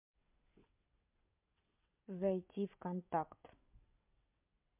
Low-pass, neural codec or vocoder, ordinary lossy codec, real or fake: 3.6 kHz; vocoder, 22.05 kHz, 80 mel bands, WaveNeXt; MP3, 32 kbps; fake